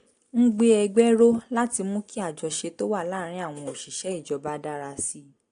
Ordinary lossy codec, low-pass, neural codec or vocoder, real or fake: AAC, 48 kbps; 9.9 kHz; none; real